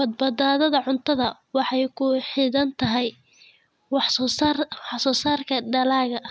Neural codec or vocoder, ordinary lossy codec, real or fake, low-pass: none; none; real; none